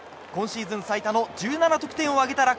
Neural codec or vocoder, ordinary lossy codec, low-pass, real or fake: none; none; none; real